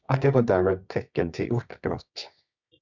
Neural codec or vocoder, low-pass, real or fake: codec, 24 kHz, 0.9 kbps, WavTokenizer, medium music audio release; 7.2 kHz; fake